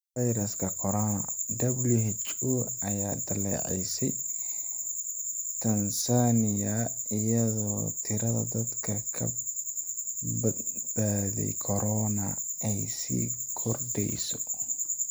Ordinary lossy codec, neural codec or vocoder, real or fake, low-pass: none; none; real; none